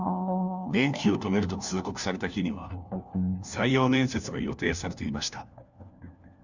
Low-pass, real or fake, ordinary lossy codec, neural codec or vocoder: 7.2 kHz; fake; none; codec, 16 kHz, 1 kbps, FunCodec, trained on LibriTTS, 50 frames a second